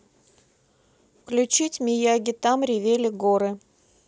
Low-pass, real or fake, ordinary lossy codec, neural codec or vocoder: none; real; none; none